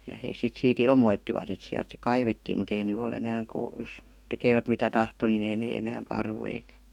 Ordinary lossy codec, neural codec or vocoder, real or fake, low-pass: none; codec, 44.1 kHz, 2.6 kbps, DAC; fake; 19.8 kHz